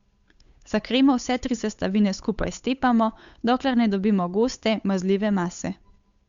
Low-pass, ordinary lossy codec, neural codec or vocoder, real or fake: 7.2 kHz; Opus, 64 kbps; codec, 16 kHz, 8 kbps, FunCodec, trained on Chinese and English, 25 frames a second; fake